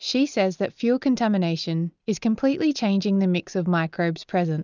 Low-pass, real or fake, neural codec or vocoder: 7.2 kHz; real; none